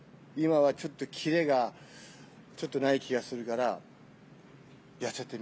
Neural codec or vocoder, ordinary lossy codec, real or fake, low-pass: none; none; real; none